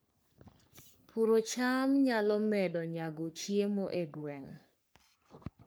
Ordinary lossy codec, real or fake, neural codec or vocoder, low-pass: none; fake; codec, 44.1 kHz, 3.4 kbps, Pupu-Codec; none